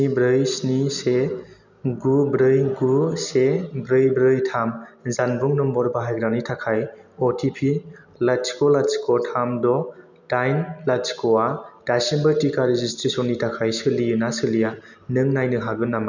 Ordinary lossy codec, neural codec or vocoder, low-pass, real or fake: none; none; 7.2 kHz; real